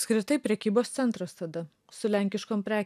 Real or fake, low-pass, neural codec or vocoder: real; 14.4 kHz; none